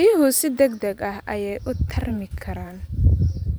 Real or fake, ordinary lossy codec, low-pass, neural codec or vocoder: fake; none; none; vocoder, 44.1 kHz, 128 mel bands every 512 samples, BigVGAN v2